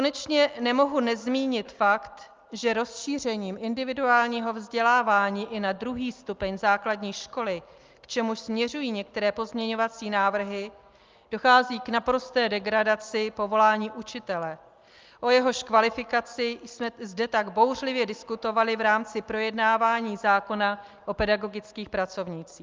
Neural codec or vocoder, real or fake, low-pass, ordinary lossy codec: none; real; 7.2 kHz; Opus, 24 kbps